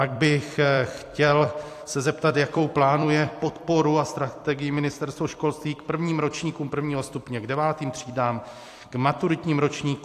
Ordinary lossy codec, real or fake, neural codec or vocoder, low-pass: AAC, 64 kbps; fake; vocoder, 44.1 kHz, 128 mel bands every 256 samples, BigVGAN v2; 14.4 kHz